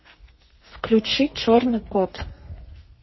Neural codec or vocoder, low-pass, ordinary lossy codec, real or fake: codec, 32 kHz, 1.9 kbps, SNAC; 7.2 kHz; MP3, 24 kbps; fake